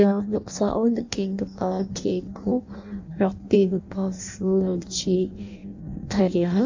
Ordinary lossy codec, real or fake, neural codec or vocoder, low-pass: AAC, 48 kbps; fake; codec, 16 kHz in and 24 kHz out, 0.6 kbps, FireRedTTS-2 codec; 7.2 kHz